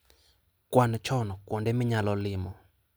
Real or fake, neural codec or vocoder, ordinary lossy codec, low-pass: real; none; none; none